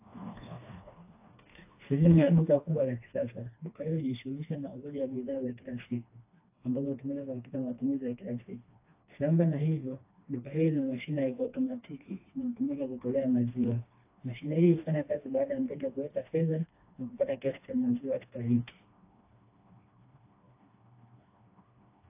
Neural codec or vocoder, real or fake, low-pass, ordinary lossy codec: codec, 16 kHz, 2 kbps, FreqCodec, smaller model; fake; 3.6 kHz; AAC, 24 kbps